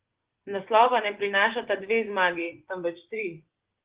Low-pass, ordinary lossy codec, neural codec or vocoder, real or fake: 3.6 kHz; Opus, 16 kbps; vocoder, 24 kHz, 100 mel bands, Vocos; fake